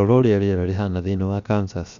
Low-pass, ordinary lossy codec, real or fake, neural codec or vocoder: 7.2 kHz; none; fake; codec, 16 kHz, 0.7 kbps, FocalCodec